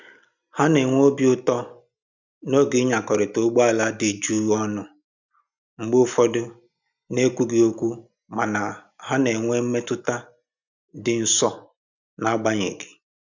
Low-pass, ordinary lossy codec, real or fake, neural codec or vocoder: 7.2 kHz; none; real; none